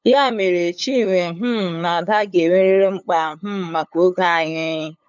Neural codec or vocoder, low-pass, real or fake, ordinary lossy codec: codec, 16 kHz, 8 kbps, FunCodec, trained on LibriTTS, 25 frames a second; 7.2 kHz; fake; none